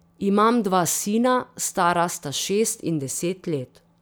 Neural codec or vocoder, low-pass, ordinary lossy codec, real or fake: none; none; none; real